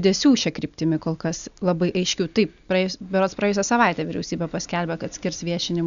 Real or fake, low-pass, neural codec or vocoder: real; 7.2 kHz; none